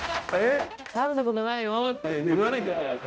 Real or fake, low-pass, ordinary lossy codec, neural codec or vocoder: fake; none; none; codec, 16 kHz, 0.5 kbps, X-Codec, HuBERT features, trained on balanced general audio